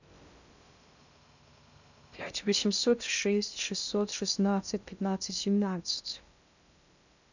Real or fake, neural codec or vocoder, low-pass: fake; codec, 16 kHz in and 24 kHz out, 0.6 kbps, FocalCodec, streaming, 2048 codes; 7.2 kHz